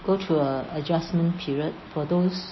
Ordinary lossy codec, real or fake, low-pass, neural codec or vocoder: MP3, 24 kbps; real; 7.2 kHz; none